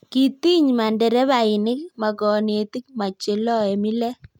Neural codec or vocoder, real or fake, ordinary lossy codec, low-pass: none; real; none; 19.8 kHz